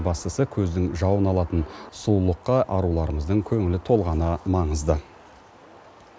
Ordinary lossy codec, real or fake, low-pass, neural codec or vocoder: none; real; none; none